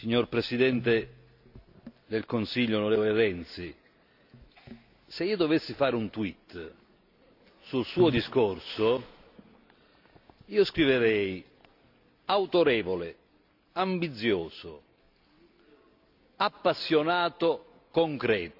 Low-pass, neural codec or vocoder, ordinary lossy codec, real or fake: 5.4 kHz; none; AAC, 48 kbps; real